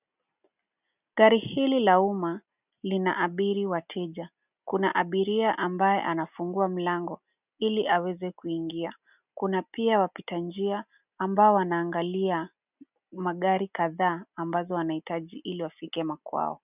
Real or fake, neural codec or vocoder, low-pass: real; none; 3.6 kHz